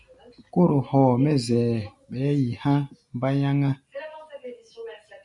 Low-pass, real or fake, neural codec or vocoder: 10.8 kHz; real; none